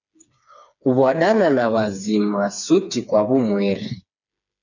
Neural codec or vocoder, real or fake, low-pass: codec, 16 kHz, 4 kbps, FreqCodec, smaller model; fake; 7.2 kHz